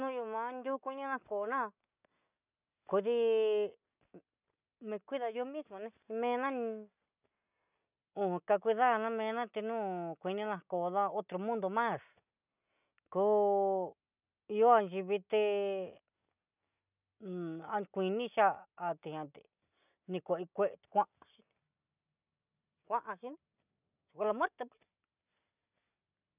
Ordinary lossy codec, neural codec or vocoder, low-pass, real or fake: none; none; 3.6 kHz; real